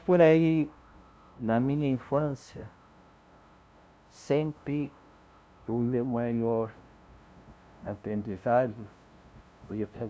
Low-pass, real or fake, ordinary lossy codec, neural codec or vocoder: none; fake; none; codec, 16 kHz, 0.5 kbps, FunCodec, trained on LibriTTS, 25 frames a second